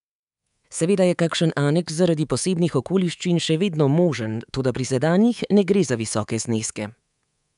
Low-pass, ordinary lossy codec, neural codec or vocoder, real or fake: 10.8 kHz; none; codec, 24 kHz, 3.1 kbps, DualCodec; fake